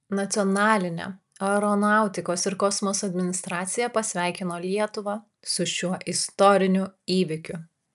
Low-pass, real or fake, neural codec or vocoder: 14.4 kHz; real; none